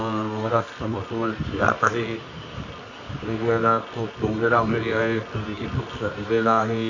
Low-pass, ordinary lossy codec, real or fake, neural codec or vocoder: 7.2 kHz; AAC, 48 kbps; fake; codec, 24 kHz, 0.9 kbps, WavTokenizer, medium music audio release